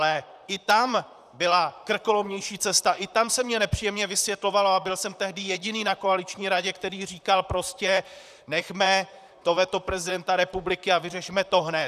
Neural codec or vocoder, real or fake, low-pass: vocoder, 44.1 kHz, 128 mel bands, Pupu-Vocoder; fake; 14.4 kHz